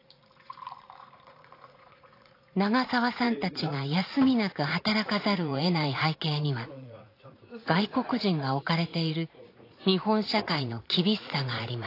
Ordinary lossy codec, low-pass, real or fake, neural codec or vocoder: AAC, 32 kbps; 5.4 kHz; fake; vocoder, 44.1 kHz, 80 mel bands, Vocos